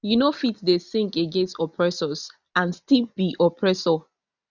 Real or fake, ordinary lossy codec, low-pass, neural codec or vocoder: real; none; 7.2 kHz; none